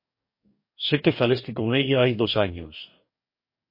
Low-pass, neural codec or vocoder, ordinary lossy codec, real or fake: 5.4 kHz; codec, 44.1 kHz, 2.6 kbps, DAC; MP3, 32 kbps; fake